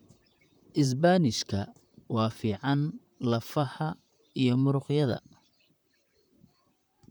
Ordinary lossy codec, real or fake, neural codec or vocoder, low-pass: none; fake; vocoder, 44.1 kHz, 128 mel bands, Pupu-Vocoder; none